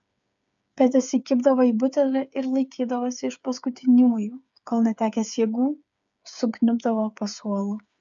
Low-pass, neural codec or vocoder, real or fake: 7.2 kHz; codec, 16 kHz, 8 kbps, FreqCodec, smaller model; fake